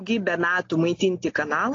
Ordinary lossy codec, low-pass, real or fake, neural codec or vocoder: AAC, 32 kbps; 7.2 kHz; real; none